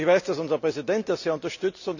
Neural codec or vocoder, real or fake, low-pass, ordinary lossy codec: none; real; 7.2 kHz; none